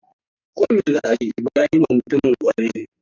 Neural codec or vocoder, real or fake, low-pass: codec, 44.1 kHz, 2.6 kbps, SNAC; fake; 7.2 kHz